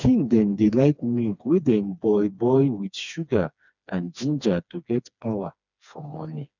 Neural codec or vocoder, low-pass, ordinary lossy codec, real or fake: codec, 16 kHz, 2 kbps, FreqCodec, smaller model; 7.2 kHz; none; fake